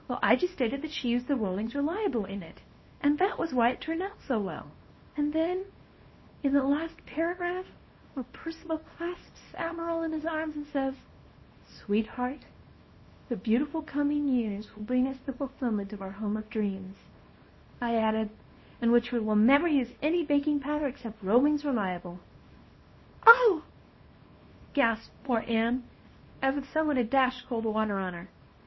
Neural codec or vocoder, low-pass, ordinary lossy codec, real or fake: codec, 24 kHz, 0.9 kbps, WavTokenizer, small release; 7.2 kHz; MP3, 24 kbps; fake